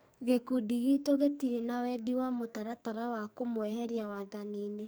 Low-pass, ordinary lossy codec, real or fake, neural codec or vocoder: none; none; fake; codec, 44.1 kHz, 2.6 kbps, SNAC